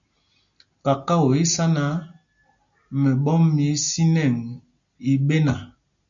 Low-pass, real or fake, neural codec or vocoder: 7.2 kHz; real; none